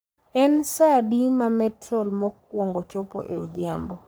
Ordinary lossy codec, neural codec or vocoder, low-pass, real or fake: none; codec, 44.1 kHz, 3.4 kbps, Pupu-Codec; none; fake